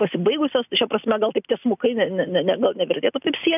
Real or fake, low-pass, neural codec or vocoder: real; 3.6 kHz; none